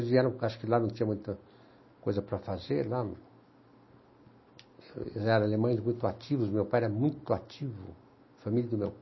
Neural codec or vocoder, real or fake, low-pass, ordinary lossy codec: none; real; 7.2 kHz; MP3, 24 kbps